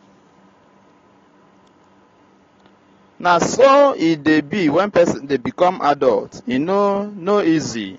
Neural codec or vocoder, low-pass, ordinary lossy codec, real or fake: none; 7.2 kHz; AAC, 32 kbps; real